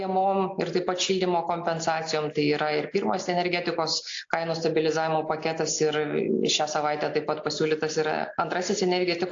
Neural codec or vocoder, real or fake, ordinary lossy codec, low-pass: none; real; AAC, 48 kbps; 7.2 kHz